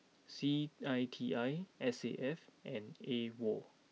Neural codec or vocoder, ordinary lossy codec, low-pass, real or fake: none; none; none; real